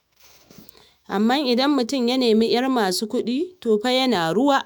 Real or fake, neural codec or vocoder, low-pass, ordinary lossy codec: fake; autoencoder, 48 kHz, 128 numbers a frame, DAC-VAE, trained on Japanese speech; none; none